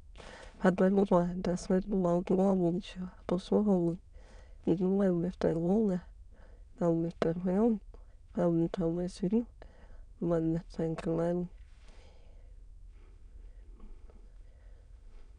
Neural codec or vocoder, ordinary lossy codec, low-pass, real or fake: autoencoder, 22.05 kHz, a latent of 192 numbers a frame, VITS, trained on many speakers; none; 9.9 kHz; fake